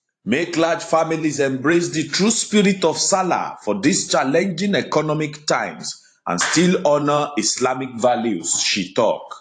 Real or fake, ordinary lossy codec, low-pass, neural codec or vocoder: fake; AAC, 48 kbps; 9.9 kHz; vocoder, 44.1 kHz, 128 mel bands every 512 samples, BigVGAN v2